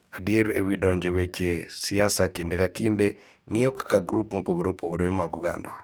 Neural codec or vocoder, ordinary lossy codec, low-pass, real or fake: codec, 44.1 kHz, 2.6 kbps, DAC; none; none; fake